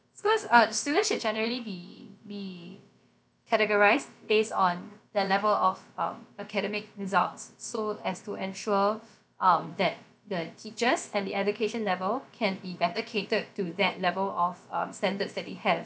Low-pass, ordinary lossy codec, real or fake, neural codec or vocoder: none; none; fake; codec, 16 kHz, about 1 kbps, DyCAST, with the encoder's durations